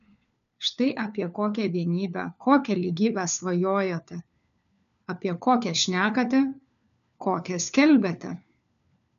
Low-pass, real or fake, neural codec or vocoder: 7.2 kHz; fake; codec, 16 kHz, 4 kbps, FunCodec, trained on LibriTTS, 50 frames a second